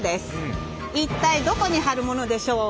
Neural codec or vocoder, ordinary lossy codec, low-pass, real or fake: none; none; none; real